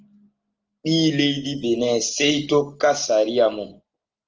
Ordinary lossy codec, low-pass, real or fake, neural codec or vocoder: Opus, 24 kbps; 7.2 kHz; real; none